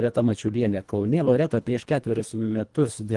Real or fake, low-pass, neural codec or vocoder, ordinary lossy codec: fake; 10.8 kHz; codec, 24 kHz, 1.5 kbps, HILCodec; Opus, 24 kbps